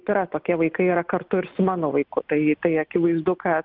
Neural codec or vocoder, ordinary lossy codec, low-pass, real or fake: none; Opus, 24 kbps; 5.4 kHz; real